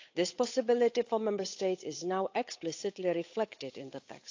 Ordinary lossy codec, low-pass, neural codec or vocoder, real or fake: AAC, 48 kbps; 7.2 kHz; codec, 16 kHz, 8 kbps, FunCodec, trained on Chinese and English, 25 frames a second; fake